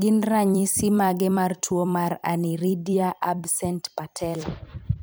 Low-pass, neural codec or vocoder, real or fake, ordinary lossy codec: none; vocoder, 44.1 kHz, 128 mel bands every 256 samples, BigVGAN v2; fake; none